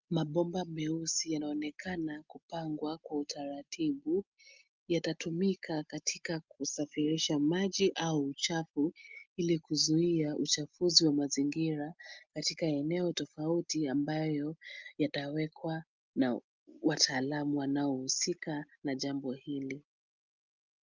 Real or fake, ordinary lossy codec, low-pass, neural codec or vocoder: real; Opus, 32 kbps; 7.2 kHz; none